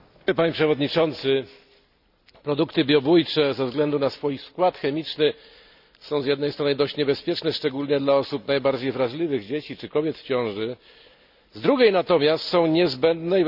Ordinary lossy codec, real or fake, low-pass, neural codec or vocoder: none; real; 5.4 kHz; none